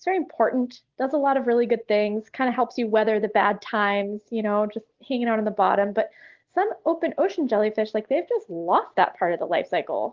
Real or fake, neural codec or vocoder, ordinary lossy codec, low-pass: real; none; Opus, 16 kbps; 7.2 kHz